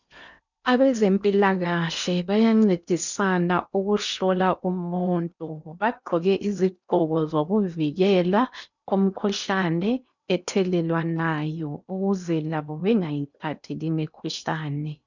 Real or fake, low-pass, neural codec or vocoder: fake; 7.2 kHz; codec, 16 kHz in and 24 kHz out, 0.8 kbps, FocalCodec, streaming, 65536 codes